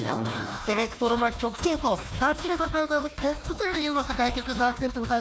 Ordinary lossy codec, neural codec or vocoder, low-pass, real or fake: none; codec, 16 kHz, 1 kbps, FunCodec, trained on Chinese and English, 50 frames a second; none; fake